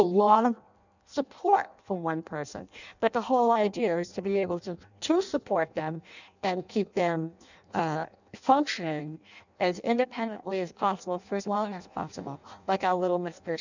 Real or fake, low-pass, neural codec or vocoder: fake; 7.2 kHz; codec, 16 kHz in and 24 kHz out, 0.6 kbps, FireRedTTS-2 codec